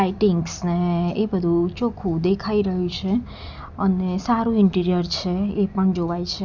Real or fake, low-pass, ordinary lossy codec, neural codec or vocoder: real; 7.2 kHz; none; none